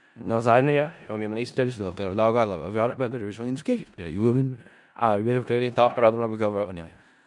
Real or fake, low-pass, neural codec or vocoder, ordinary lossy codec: fake; 10.8 kHz; codec, 16 kHz in and 24 kHz out, 0.4 kbps, LongCat-Audio-Codec, four codebook decoder; MP3, 96 kbps